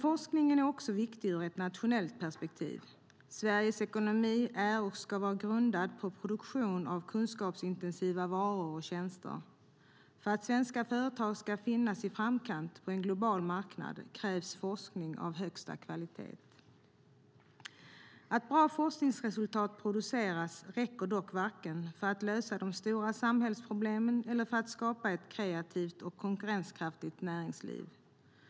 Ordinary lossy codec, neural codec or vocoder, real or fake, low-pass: none; none; real; none